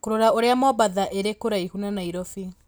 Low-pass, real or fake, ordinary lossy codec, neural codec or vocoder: none; real; none; none